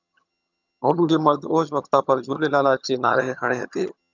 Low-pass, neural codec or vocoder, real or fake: 7.2 kHz; vocoder, 22.05 kHz, 80 mel bands, HiFi-GAN; fake